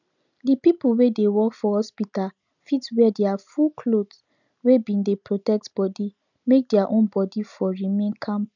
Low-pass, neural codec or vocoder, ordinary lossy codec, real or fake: 7.2 kHz; none; none; real